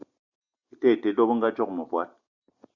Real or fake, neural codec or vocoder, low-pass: real; none; 7.2 kHz